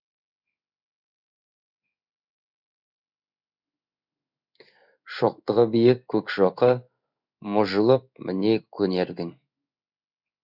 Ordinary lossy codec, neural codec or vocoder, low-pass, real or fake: none; codec, 16 kHz in and 24 kHz out, 1 kbps, XY-Tokenizer; 5.4 kHz; fake